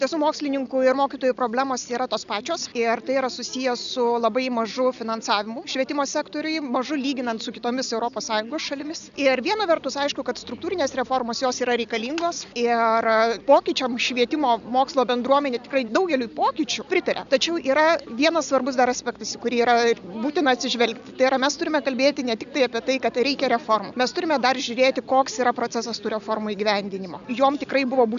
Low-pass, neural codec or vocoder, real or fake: 7.2 kHz; none; real